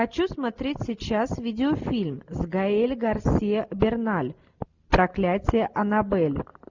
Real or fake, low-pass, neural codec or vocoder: real; 7.2 kHz; none